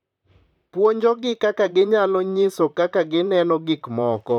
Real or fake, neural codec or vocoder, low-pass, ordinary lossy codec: fake; vocoder, 44.1 kHz, 128 mel bands, Pupu-Vocoder; 19.8 kHz; none